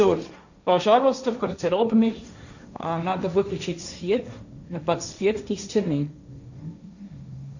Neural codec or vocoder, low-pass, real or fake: codec, 16 kHz, 1.1 kbps, Voila-Tokenizer; 7.2 kHz; fake